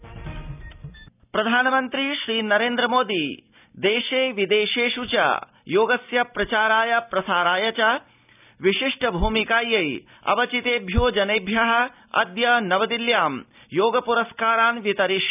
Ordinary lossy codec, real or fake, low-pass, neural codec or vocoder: none; real; 3.6 kHz; none